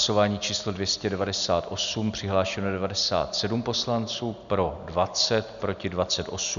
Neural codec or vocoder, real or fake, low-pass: none; real; 7.2 kHz